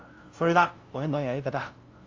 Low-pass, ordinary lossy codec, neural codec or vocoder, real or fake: 7.2 kHz; Opus, 32 kbps; codec, 16 kHz, 0.5 kbps, FunCodec, trained on LibriTTS, 25 frames a second; fake